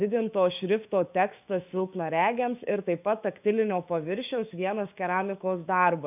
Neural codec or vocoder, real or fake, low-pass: codec, 16 kHz, 6 kbps, DAC; fake; 3.6 kHz